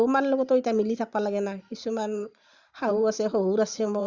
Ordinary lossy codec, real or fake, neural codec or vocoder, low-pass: Opus, 64 kbps; fake; vocoder, 44.1 kHz, 128 mel bands every 512 samples, BigVGAN v2; 7.2 kHz